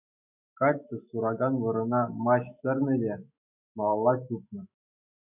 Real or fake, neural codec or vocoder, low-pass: fake; autoencoder, 48 kHz, 128 numbers a frame, DAC-VAE, trained on Japanese speech; 3.6 kHz